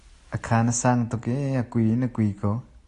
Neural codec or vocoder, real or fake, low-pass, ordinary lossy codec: none; real; 10.8 kHz; AAC, 48 kbps